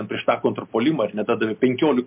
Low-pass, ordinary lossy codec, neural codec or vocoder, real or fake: 3.6 kHz; MP3, 32 kbps; none; real